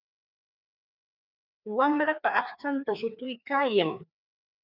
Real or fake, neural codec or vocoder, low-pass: fake; codec, 16 kHz, 2 kbps, FreqCodec, larger model; 5.4 kHz